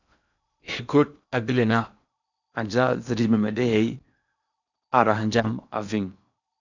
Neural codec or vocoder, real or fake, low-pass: codec, 16 kHz in and 24 kHz out, 0.8 kbps, FocalCodec, streaming, 65536 codes; fake; 7.2 kHz